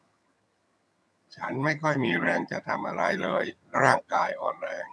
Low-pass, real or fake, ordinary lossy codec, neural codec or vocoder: none; fake; none; vocoder, 22.05 kHz, 80 mel bands, HiFi-GAN